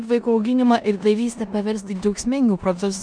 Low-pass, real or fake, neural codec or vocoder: 9.9 kHz; fake; codec, 16 kHz in and 24 kHz out, 0.9 kbps, LongCat-Audio-Codec, four codebook decoder